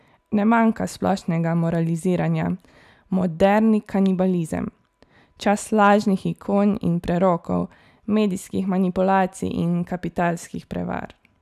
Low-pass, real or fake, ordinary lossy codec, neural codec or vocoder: 14.4 kHz; real; none; none